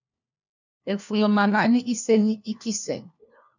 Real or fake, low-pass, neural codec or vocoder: fake; 7.2 kHz; codec, 16 kHz, 1 kbps, FunCodec, trained on LibriTTS, 50 frames a second